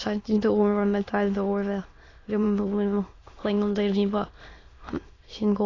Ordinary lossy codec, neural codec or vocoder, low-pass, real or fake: AAC, 32 kbps; autoencoder, 22.05 kHz, a latent of 192 numbers a frame, VITS, trained on many speakers; 7.2 kHz; fake